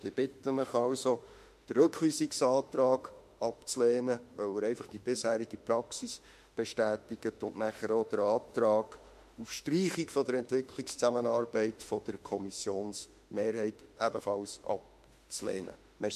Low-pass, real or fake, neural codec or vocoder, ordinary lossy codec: 14.4 kHz; fake; autoencoder, 48 kHz, 32 numbers a frame, DAC-VAE, trained on Japanese speech; MP3, 64 kbps